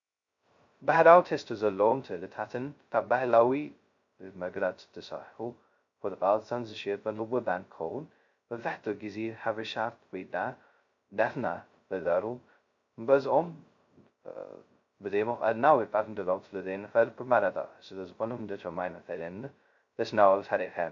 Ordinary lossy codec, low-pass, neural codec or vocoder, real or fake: MP3, 48 kbps; 7.2 kHz; codec, 16 kHz, 0.2 kbps, FocalCodec; fake